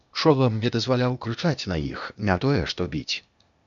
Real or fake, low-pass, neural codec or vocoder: fake; 7.2 kHz; codec, 16 kHz, 0.8 kbps, ZipCodec